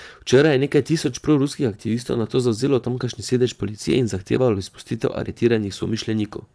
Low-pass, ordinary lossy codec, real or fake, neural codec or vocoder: none; none; fake; vocoder, 22.05 kHz, 80 mel bands, Vocos